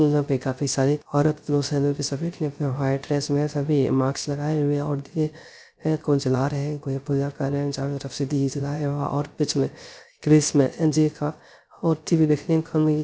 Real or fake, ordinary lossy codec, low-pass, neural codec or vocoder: fake; none; none; codec, 16 kHz, 0.3 kbps, FocalCodec